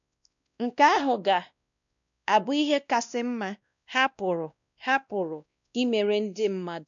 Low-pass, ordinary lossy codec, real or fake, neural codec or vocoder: 7.2 kHz; none; fake; codec, 16 kHz, 1 kbps, X-Codec, WavLM features, trained on Multilingual LibriSpeech